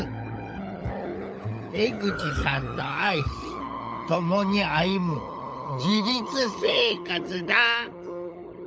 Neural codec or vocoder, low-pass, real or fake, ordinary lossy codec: codec, 16 kHz, 16 kbps, FunCodec, trained on LibriTTS, 50 frames a second; none; fake; none